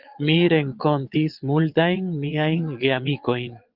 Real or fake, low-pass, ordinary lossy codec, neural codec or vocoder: fake; 5.4 kHz; Opus, 24 kbps; vocoder, 22.05 kHz, 80 mel bands, Vocos